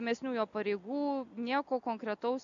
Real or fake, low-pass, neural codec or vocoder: real; 7.2 kHz; none